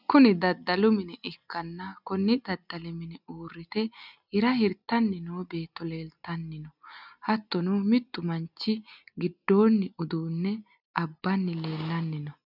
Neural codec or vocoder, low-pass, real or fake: none; 5.4 kHz; real